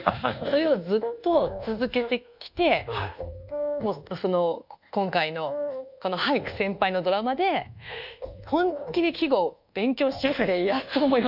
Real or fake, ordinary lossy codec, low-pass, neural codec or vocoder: fake; none; 5.4 kHz; codec, 24 kHz, 1.2 kbps, DualCodec